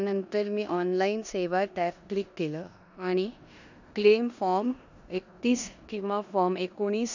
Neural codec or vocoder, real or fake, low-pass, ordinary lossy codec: codec, 16 kHz in and 24 kHz out, 0.9 kbps, LongCat-Audio-Codec, four codebook decoder; fake; 7.2 kHz; none